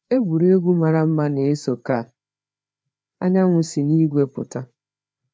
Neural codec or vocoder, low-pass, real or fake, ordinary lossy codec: codec, 16 kHz, 4 kbps, FreqCodec, larger model; none; fake; none